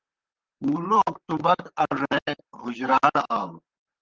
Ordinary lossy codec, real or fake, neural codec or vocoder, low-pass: Opus, 16 kbps; fake; vocoder, 44.1 kHz, 128 mel bands, Pupu-Vocoder; 7.2 kHz